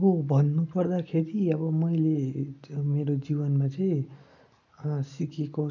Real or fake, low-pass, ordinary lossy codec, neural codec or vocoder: real; 7.2 kHz; none; none